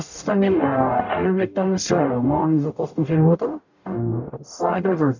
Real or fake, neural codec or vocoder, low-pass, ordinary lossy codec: fake; codec, 44.1 kHz, 0.9 kbps, DAC; 7.2 kHz; none